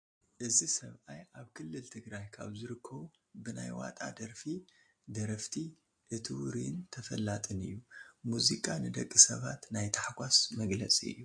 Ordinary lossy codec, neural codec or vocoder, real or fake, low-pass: MP3, 48 kbps; none; real; 9.9 kHz